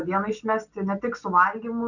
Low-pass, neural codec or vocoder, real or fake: 7.2 kHz; none; real